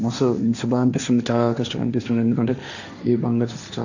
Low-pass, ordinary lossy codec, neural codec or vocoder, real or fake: 7.2 kHz; none; codec, 16 kHz, 1.1 kbps, Voila-Tokenizer; fake